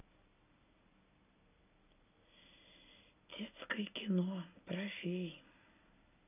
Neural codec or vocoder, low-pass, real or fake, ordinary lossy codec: none; 3.6 kHz; real; none